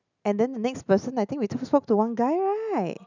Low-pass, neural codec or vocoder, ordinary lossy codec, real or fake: 7.2 kHz; none; none; real